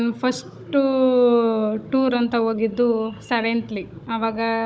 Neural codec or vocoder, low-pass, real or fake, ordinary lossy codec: codec, 16 kHz, 16 kbps, FunCodec, trained on Chinese and English, 50 frames a second; none; fake; none